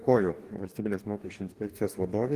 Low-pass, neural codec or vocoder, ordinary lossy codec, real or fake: 14.4 kHz; codec, 44.1 kHz, 2.6 kbps, DAC; Opus, 24 kbps; fake